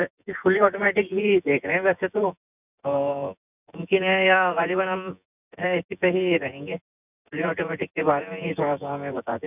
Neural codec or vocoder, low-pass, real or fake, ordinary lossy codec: vocoder, 24 kHz, 100 mel bands, Vocos; 3.6 kHz; fake; none